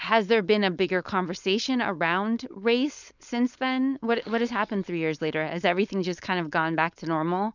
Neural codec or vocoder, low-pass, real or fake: codec, 16 kHz, 4.8 kbps, FACodec; 7.2 kHz; fake